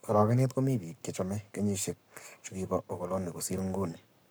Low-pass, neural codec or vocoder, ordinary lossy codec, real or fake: none; codec, 44.1 kHz, 7.8 kbps, Pupu-Codec; none; fake